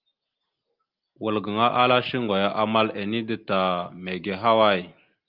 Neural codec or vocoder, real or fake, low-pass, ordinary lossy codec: none; real; 5.4 kHz; Opus, 24 kbps